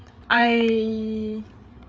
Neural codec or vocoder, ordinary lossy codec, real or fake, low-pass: codec, 16 kHz, 8 kbps, FreqCodec, larger model; none; fake; none